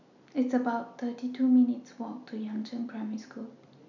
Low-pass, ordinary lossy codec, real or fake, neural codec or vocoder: 7.2 kHz; none; real; none